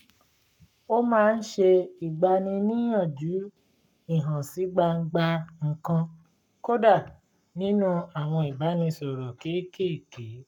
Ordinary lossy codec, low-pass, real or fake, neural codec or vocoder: none; 19.8 kHz; fake; codec, 44.1 kHz, 7.8 kbps, Pupu-Codec